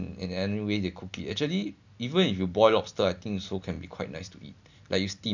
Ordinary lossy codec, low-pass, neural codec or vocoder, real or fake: none; 7.2 kHz; none; real